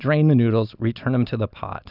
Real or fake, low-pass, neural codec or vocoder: real; 5.4 kHz; none